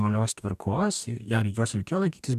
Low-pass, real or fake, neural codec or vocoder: 14.4 kHz; fake; codec, 44.1 kHz, 2.6 kbps, DAC